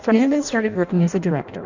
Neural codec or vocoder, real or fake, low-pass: codec, 16 kHz in and 24 kHz out, 0.6 kbps, FireRedTTS-2 codec; fake; 7.2 kHz